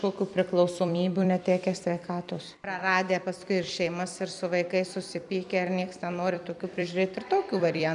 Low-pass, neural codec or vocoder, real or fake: 10.8 kHz; vocoder, 44.1 kHz, 128 mel bands every 256 samples, BigVGAN v2; fake